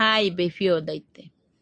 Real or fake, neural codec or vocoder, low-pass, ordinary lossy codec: real; none; 9.9 kHz; AAC, 48 kbps